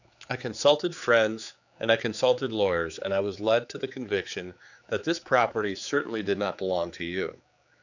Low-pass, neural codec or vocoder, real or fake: 7.2 kHz; codec, 16 kHz, 4 kbps, X-Codec, HuBERT features, trained on general audio; fake